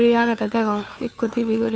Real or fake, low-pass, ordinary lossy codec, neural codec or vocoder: fake; none; none; codec, 16 kHz, 2 kbps, FunCodec, trained on Chinese and English, 25 frames a second